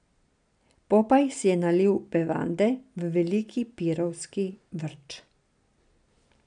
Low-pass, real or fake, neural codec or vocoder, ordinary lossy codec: 9.9 kHz; real; none; none